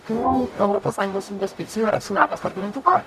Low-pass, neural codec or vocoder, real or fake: 14.4 kHz; codec, 44.1 kHz, 0.9 kbps, DAC; fake